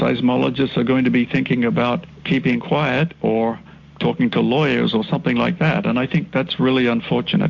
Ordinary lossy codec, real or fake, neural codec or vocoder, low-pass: MP3, 48 kbps; real; none; 7.2 kHz